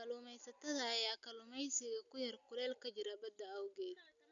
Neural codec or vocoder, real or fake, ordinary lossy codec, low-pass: none; real; none; 7.2 kHz